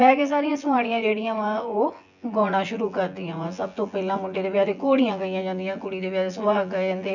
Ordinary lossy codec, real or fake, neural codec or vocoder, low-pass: none; fake; vocoder, 24 kHz, 100 mel bands, Vocos; 7.2 kHz